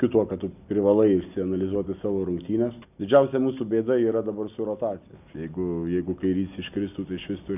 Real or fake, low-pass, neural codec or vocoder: real; 3.6 kHz; none